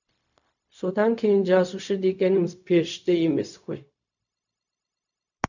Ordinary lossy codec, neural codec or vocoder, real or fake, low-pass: none; codec, 16 kHz, 0.4 kbps, LongCat-Audio-Codec; fake; 7.2 kHz